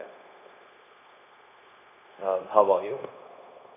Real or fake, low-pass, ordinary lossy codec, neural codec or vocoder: fake; 3.6 kHz; none; codec, 16 kHz, 0.9 kbps, LongCat-Audio-Codec